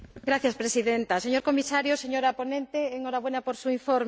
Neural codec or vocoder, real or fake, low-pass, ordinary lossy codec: none; real; none; none